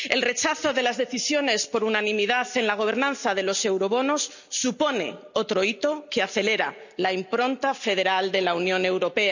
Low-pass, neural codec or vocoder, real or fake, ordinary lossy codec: 7.2 kHz; none; real; none